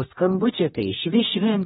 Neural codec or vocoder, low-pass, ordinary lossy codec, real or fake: codec, 16 kHz, 1 kbps, X-Codec, HuBERT features, trained on general audio; 7.2 kHz; AAC, 16 kbps; fake